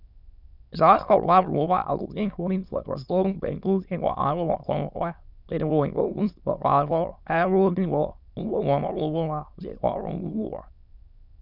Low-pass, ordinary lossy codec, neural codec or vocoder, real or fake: 5.4 kHz; none; autoencoder, 22.05 kHz, a latent of 192 numbers a frame, VITS, trained on many speakers; fake